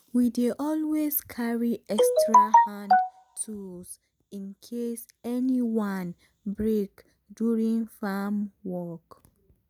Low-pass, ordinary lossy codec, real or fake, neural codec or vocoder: none; none; real; none